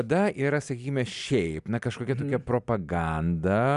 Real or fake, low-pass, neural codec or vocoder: real; 10.8 kHz; none